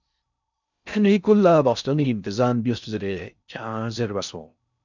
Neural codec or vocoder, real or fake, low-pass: codec, 16 kHz in and 24 kHz out, 0.6 kbps, FocalCodec, streaming, 4096 codes; fake; 7.2 kHz